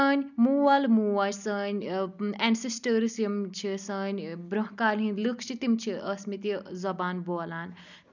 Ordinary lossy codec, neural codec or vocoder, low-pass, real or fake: none; none; 7.2 kHz; real